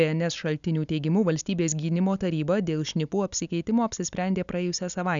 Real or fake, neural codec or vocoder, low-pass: real; none; 7.2 kHz